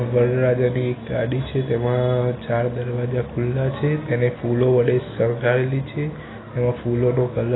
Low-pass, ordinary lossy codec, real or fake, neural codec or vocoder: 7.2 kHz; AAC, 16 kbps; real; none